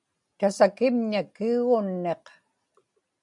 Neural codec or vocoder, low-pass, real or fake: none; 10.8 kHz; real